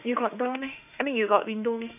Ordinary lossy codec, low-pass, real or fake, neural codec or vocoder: none; 3.6 kHz; fake; autoencoder, 48 kHz, 32 numbers a frame, DAC-VAE, trained on Japanese speech